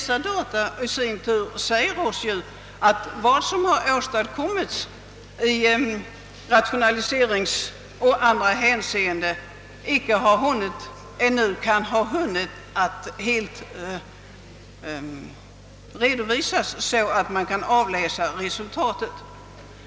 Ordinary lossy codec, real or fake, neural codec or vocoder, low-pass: none; real; none; none